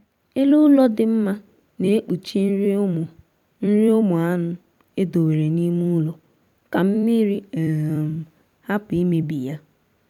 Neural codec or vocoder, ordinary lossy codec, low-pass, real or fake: vocoder, 44.1 kHz, 128 mel bands every 256 samples, BigVGAN v2; none; 19.8 kHz; fake